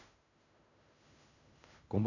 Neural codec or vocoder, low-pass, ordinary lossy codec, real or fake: codec, 16 kHz in and 24 kHz out, 0.4 kbps, LongCat-Audio-Codec, fine tuned four codebook decoder; 7.2 kHz; none; fake